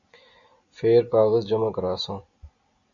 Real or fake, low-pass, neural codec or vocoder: real; 7.2 kHz; none